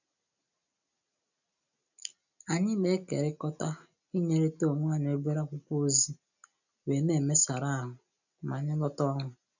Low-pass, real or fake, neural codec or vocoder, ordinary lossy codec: 7.2 kHz; real; none; none